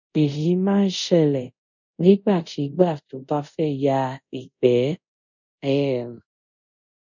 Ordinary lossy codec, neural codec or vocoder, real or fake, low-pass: none; codec, 24 kHz, 0.5 kbps, DualCodec; fake; 7.2 kHz